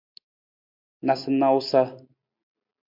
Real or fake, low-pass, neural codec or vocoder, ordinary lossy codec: real; 5.4 kHz; none; MP3, 48 kbps